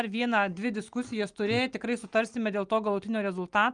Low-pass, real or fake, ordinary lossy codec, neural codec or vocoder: 9.9 kHz; real; Opus, 32 kbps; none